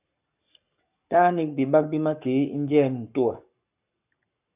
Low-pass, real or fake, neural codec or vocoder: 3.6 kHz; fake; codec, 44.1 kHz, 7.8 kbps, Pupu-Codec